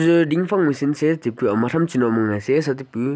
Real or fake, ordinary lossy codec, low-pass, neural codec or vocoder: real; none; none; none